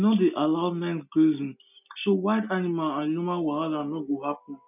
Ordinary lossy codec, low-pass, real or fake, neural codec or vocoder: none; 3.6 kHz; real; none